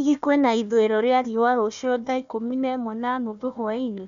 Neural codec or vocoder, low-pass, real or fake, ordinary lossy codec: codec, 16 kHz, 1 kbps, FunCodec, trained on Chinese and English, 50 frames a second; 7.2 kHz; fake; none